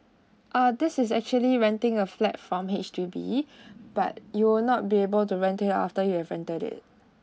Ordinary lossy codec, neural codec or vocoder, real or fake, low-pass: none; none; real; none